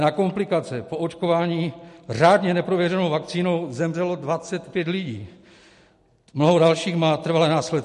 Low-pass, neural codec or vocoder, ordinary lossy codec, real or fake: 14.4 kHz; none; MP3, 48 kbps; real